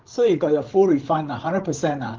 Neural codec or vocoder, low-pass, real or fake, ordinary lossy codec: codec, 16 kHz, 8 kbps, FreqCodec, larger model; 7.2 kHz; fake; Opus, 16 kbps